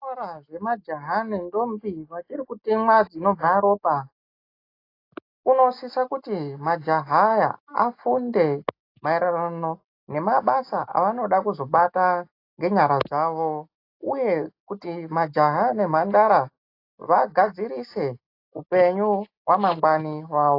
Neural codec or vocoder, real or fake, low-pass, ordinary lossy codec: none; real; 5.4 kHz; AAC, 32 kbps